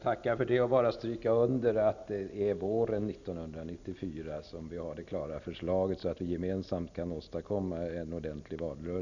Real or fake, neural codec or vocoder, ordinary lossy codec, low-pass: real; none; none; 7.2 kHz